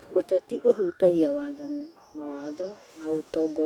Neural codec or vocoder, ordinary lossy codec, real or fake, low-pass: codec, 44.1 kHz, 2.6 kbps, DAC; none; fake; 19.8 kHz